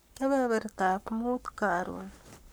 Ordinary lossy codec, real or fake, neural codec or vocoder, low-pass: none; fake; codec, 44.1 kHz, 7.8 kbps, Pupu-Codec; none